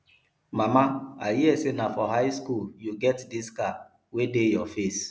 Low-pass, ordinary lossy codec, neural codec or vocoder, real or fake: none; none; none; real